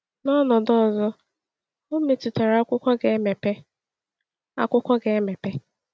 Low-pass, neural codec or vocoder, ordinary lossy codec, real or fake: none; none; none; real